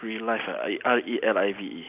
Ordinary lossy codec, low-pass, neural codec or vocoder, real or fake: AAC, 32 kbps; 3.6 kHz; none; real